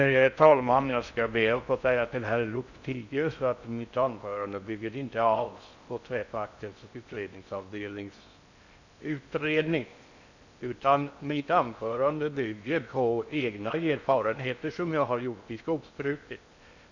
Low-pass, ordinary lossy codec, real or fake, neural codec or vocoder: 7.2 kHz; none; fake; codec, 16 kHz in and 24 kHz out, 0.6 kbps, FocalCodec, streaming, 4096 codes